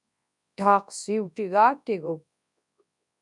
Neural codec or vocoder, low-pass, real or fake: codec, 24 kHz, 0.9 kbps, WavTokenizer, large speech release; 10.8 kHz; fake